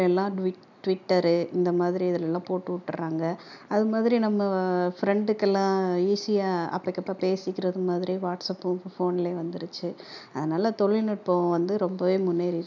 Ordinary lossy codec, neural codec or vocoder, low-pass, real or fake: none; none; 7.2 kHz; real